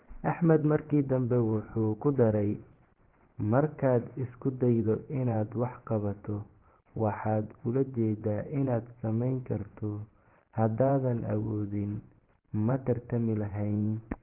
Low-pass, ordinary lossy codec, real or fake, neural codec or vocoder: 3.6 kHz; Opus, 16 kbps; fake; vocoder, 24 kHz, 100 mel bands, Vocos